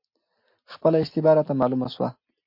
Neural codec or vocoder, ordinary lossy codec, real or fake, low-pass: none; MP3, 32 kbps; real; 5.4 kHz